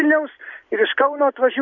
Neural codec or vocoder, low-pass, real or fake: none; 7.2 kHz; real